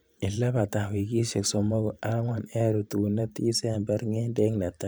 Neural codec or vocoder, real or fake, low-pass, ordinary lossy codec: vocoder, 44.1 kHz, 128 mel bands every 512 samples, BigVGAN v2; fake; none; none